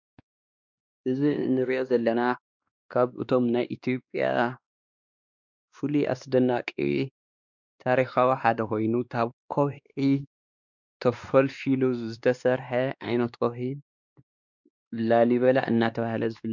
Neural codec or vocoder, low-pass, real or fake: codec, 16 kHz, 2 kbps, X-Codec, WavLM features, trained on Multilingual LibriSpeech; 7.2 kHz; fake